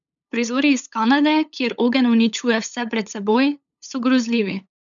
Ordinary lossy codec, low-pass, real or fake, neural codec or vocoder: none; 7.2 kHz; fake; codec, 16 kHz, 8 kbps, FunCodec, trained on LibriTTS, 25 frames a second